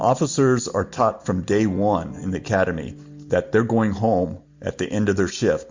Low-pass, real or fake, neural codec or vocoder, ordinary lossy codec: 7.2 kHz; real; none; MP3, 64 kbps